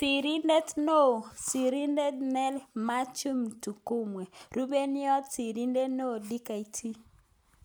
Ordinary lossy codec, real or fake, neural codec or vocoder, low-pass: none; real; none; none